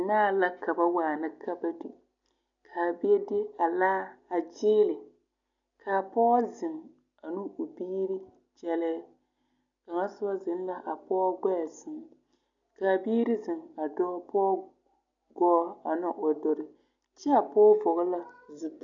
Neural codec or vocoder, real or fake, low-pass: none; real; 7.2 kHz